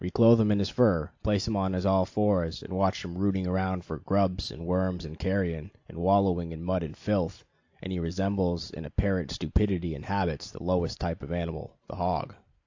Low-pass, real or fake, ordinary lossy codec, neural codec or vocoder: 7.2 kHz; real; AAC, 48 kbps; none